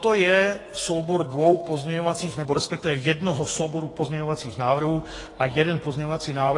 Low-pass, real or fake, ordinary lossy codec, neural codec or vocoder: 10.8 kHz; fake; AAC, 32 kbps; codec, 32 kHz, 1.9 kbps, SNAC